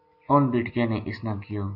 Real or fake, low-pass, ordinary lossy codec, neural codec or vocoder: real; 5.4 kHz; AAC, 48 kbps; none